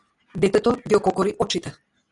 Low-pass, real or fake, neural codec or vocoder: 10.8 kHz; real; none